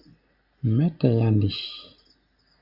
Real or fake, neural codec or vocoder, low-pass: real; none; 5.4 kHz